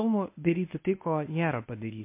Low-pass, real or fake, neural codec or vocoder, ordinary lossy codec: 3.6 kHz; fake; codec, 24 kHz, 0.9 kbps, WavTokenizer, medium speech release version 1; MP3, 24 kbps